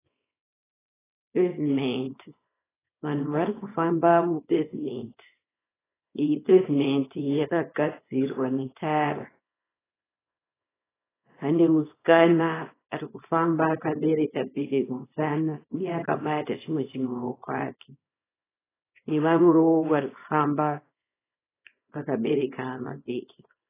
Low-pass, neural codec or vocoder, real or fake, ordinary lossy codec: 3.6 kHz; codec, 24 kHz, 0.9 kbps, WavTokenizer, small release; fake; AAC, 16 kbps